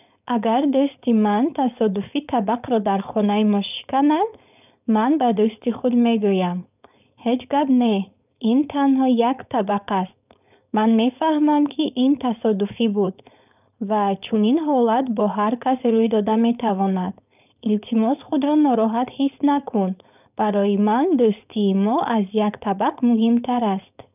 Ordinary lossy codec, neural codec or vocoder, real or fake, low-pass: none; codec, 16 kHz, 4.8 kbps, FACodec; fake; 3.6 kHz